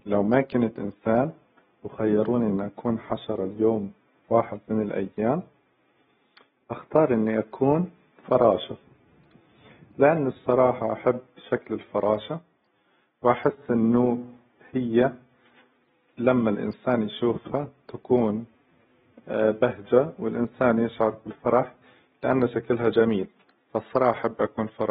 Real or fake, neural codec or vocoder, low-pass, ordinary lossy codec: real; none; 19.8 kHz; AAC, 16 kbps